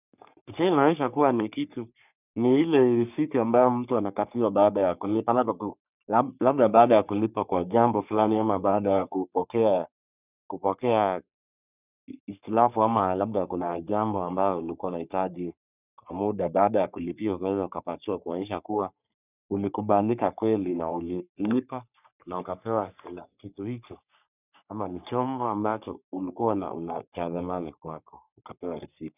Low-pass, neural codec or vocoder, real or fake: 3.6 kHz; codec, 44.1 kHz, 3.4 kbps, Pupu-Codec; fake